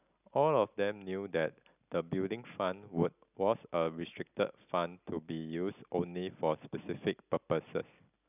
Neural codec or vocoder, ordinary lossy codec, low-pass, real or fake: none; none; 3.6 kHz; real